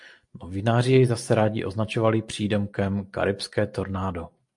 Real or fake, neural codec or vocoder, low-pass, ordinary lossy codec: real; none; 10.8 kHz; MP3, 64 kbps